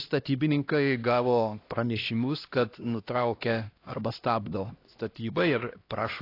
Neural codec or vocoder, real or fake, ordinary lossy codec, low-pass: codec, 16 kHz, 1 kbps, X-Codec, HuBERT features, trained on LibriSpeech; fake; AAC, 32 kbps; 5.4 kHz